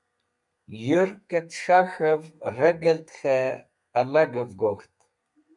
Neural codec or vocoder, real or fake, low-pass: codec, 32 kHz, 1.9 kbps, SNAC; fake; 10.8 kHz